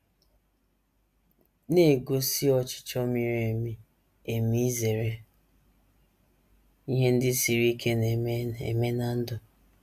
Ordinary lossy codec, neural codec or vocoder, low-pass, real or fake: none; none; 14.4 kHz; real